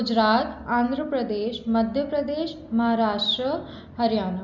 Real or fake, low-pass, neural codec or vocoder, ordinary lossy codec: real; 7.2 kHz; none; none